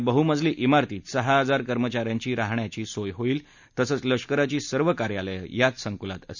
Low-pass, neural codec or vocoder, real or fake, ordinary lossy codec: 7.2 kHz; none; real; none